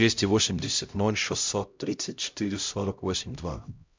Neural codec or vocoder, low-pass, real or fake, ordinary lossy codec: codec, 16 kHz, 0.5 kbps, X-Codec, HuBERT features, trained on LibriSpeech; 7.2 kHz; fake; MP3, 64 kbps